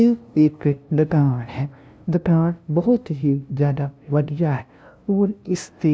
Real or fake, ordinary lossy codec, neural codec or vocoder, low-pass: fake; none; codec, 16 kHz, 0.5 kbps, FunCodec, trained on LibriTTS, 25 frames a second; none